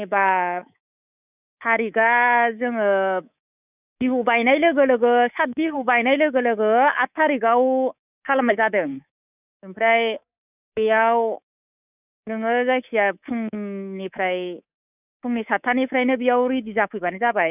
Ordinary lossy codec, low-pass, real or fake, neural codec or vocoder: none; 3.6 kHz; fake; codec, 24 kHz, 3.1 kbps, DualCodec